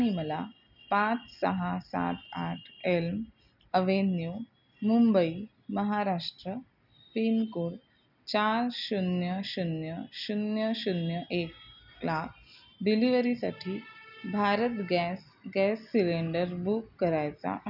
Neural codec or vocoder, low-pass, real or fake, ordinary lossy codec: none; 5.4 kHz; real; none